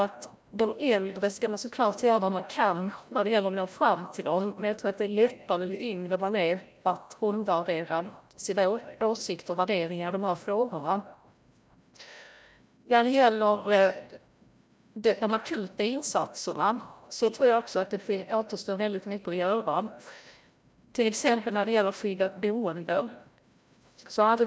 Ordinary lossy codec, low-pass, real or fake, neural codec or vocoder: none; none; fake; codec, 16 kHz, 0.5 kbps, FreqCodec, larger model